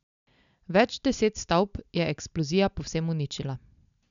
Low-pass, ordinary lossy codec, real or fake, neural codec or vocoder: 7.2 kHz; none; real; none